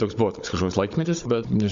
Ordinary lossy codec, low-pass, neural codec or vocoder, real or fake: MP3, 48 kbps; 7.2 kHz; codec, 16 kHz, 16 kbps, FunCodec, trained on Chinese and English, 50 frames a second; fake